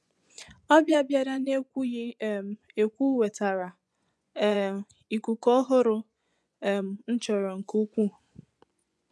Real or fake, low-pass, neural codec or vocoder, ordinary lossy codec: fake; none; vocoder, 24 kHz, 100 mel bands, Vocos; none